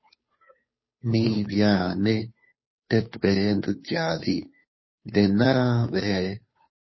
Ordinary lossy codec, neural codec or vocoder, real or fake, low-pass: MP3, 24 kbps; codec, 16 kHz, 2 kbps, FunCodec, trained on Chinese and English, 25 frames a second; fake; 7.2 kHz